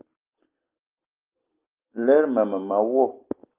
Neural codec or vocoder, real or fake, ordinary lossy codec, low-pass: none; real; Opus, 32 kbps; 3.6 kHz